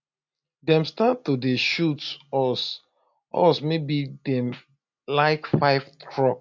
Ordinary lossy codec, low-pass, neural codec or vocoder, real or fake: MP3, 64 kbps; 7.2 kHz; none; real